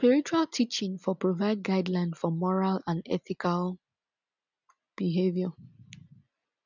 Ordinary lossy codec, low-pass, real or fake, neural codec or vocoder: none; 7.2 kHz; real; none